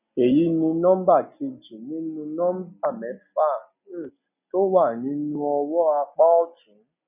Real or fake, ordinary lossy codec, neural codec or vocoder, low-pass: real; none; none; 3.6 kHz